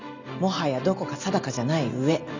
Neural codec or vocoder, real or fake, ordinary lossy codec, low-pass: none; real; Opus, 64 kbps; 7.2 kHz